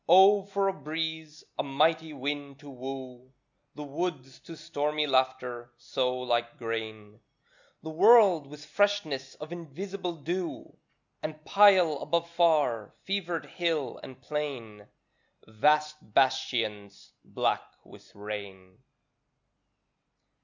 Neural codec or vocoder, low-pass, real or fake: none; 7.2 kHz; real